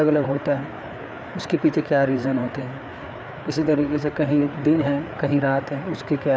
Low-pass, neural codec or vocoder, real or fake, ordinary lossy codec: none; codec, 16 kHz, 4 kbps, FreqCodec, larger model; fake; none